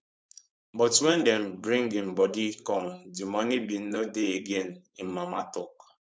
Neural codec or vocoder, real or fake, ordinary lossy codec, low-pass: codec, 16 kHz, 4.8 kbps, FACodec; fake; none; none